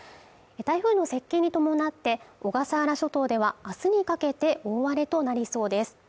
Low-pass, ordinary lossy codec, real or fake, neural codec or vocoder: none; none; real; none